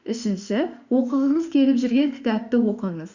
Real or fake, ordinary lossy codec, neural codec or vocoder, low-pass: fake; Opus, 64 kbps; autoencoder, 48 kHz, 32 numbers a frame, DAC-VAE, trained on Japanese speech; 7.2 kHz